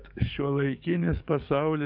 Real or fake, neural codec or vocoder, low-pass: fake; codec, 44.1 kHz, 7.8 kbps, DAC; 5.4 kHz